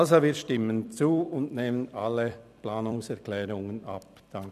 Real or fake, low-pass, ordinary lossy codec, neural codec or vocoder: fake; 14.4 kHz; none; vocoder, 44.1 kHz, 128 mel bands every 256 samples, BigVGAN v2